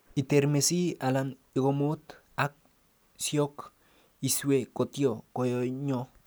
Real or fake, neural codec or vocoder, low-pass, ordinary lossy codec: real; none; none; none